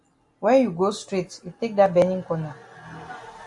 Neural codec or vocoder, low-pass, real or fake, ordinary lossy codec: none; 10.8 kHz; real; AAC, 64 kbps